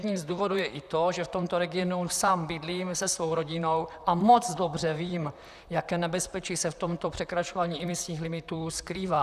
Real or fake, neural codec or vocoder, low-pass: fake; vocoder, 44.1 kHz, 128 mel bands, Pupu-Vocoder; 14.4 kHz